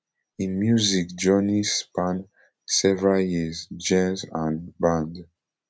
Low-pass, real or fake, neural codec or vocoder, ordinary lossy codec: none; real; none; none